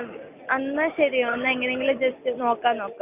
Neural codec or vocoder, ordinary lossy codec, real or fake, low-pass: none; none; real; 3.6 kHz